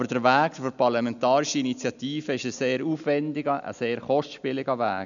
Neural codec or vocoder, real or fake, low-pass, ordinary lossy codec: none; real; 7.2 kHz; none